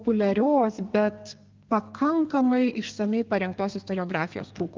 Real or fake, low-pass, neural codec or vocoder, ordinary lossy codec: fake; 7.2 kHz; codec, 32 kHz, 1.9 kbps, SNAC; Opus, 32 kbps